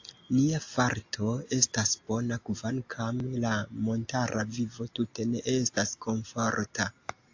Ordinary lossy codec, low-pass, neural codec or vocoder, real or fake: AAC, 48 kbps; 7.2 kHz; none; real